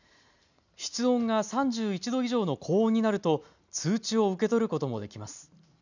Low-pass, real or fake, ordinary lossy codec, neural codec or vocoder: 7.2 kHz; real; none; none